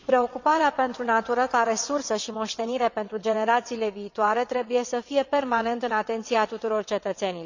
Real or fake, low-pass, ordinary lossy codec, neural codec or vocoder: fake; 7.2 kHz; none; vocoder, 22.05 kHz, 80 mel bands, WaveNeXt